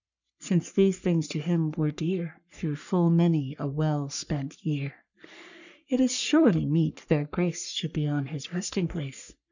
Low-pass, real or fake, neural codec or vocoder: 7.2 kHz; fake; codec, 44.1 kHz, 3.4 kbps, Pupu-Codec